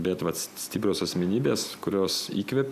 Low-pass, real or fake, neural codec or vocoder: 14.4 kHz; real; none